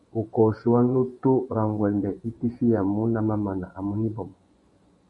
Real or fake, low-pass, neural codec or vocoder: fake; 10.8 kHz; vocoder, 24 kHz, 100 mel bands, Vocos